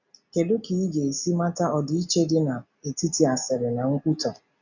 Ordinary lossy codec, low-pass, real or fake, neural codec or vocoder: none; 7.2 kHz; real; none